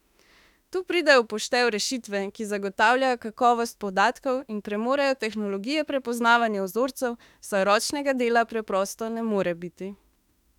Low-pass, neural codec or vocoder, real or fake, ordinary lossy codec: 19.8 kHz; autoencoder, 48 kHz, 32 numbers a frame, DAC-VAE, trained on Japanese speech; fake; none